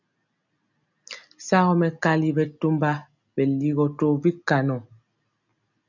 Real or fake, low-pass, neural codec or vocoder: real; 7.2 kHz; none